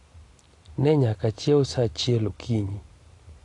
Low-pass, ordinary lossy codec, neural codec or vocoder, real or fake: 10.8 kHz; AAC, 48 kbps; none; real